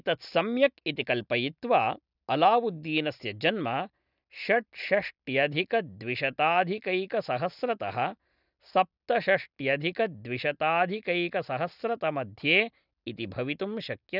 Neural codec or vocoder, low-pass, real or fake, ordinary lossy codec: none; 5.4 kHz; real; none